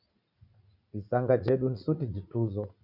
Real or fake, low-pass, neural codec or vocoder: fake; 5.4 kHz; vocoder, 44.1 kHz, 80 mel bands, Vocos